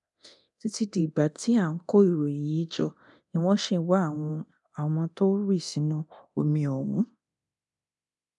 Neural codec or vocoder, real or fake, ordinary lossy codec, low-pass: codec, 24 kHz, 0.9 kbps, DualCodec; fake; none; 10.8 kHz